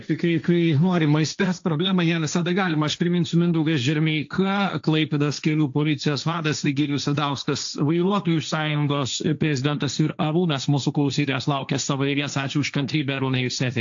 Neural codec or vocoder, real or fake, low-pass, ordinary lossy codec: codec, 16 kHz, 1.1 kbps, Voila-Tokenizer; fake; 7.2 kHz; AAC, 64 kbps